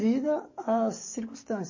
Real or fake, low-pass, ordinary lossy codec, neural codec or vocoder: fake; 7.2 kHz; MP3, 32 kbps; codec, 44.1 kHz, 7.8 kbps, DAC